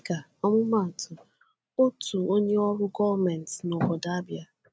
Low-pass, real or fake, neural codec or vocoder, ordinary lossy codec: none; real; none; none